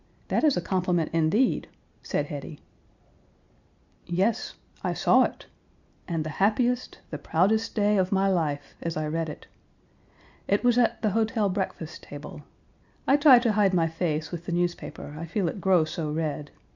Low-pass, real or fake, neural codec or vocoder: 7.2 kHz; real; none